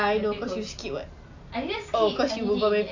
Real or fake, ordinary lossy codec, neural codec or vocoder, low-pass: real; AAC, 48 kbps; none; 7.2 kHz